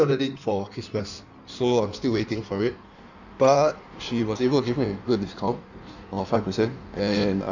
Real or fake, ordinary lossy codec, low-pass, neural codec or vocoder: fake; none; 7.2 kHz; codec, 16 kHz in and 24 kHz out, 1.1 kbps, FireRedTTS-2 codec